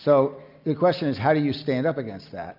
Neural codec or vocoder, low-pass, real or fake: none; 5.4 kHz; real